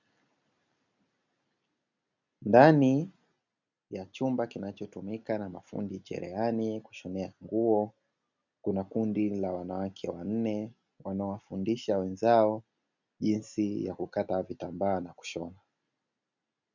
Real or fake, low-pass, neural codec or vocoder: real; 7.2 kHz; none